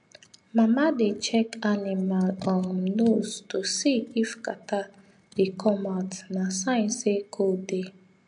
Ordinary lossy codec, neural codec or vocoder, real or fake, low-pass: MP3, 64 kbps; none; real; 9.9 kHz